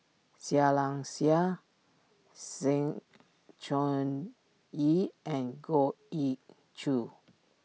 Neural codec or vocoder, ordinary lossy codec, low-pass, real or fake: none; none; none; real